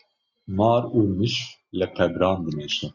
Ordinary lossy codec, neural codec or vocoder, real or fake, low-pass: Opus, 64 kbps; none; real; 7.2 kHz